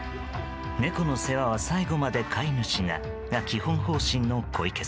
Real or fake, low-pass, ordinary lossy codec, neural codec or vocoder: real; none; none; none